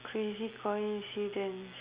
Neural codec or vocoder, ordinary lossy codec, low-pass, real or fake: none; Opus, 64 kbps; 3.6 kHz; real